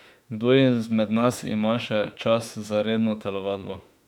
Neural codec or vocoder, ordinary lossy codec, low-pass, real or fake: autoencoder, 48 kHz, 32 numbers a frame, DAC-VAE, trained on Japanese speech; none; 19.8 kHz; fake